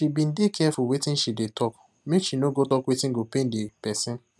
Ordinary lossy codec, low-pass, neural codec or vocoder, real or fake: none; none; none; real